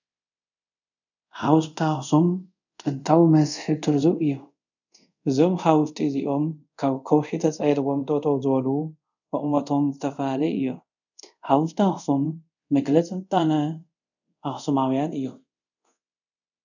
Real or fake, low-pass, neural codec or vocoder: fake; 7.2 kHz; codec, 24 kHz, 0.5 kbps, DualCodec